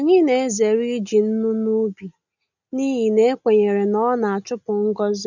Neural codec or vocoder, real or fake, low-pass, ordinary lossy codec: none; real; 7.2 kHz; none